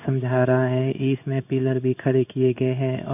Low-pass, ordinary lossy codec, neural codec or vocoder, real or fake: 3.6 kHz; none; codec, 16 kHz in and 24 kHz out, 1 kbps, XY-Tokenizer; fake